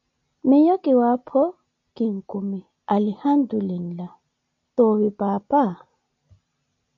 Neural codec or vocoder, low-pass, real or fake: none; 7.2 kHz; real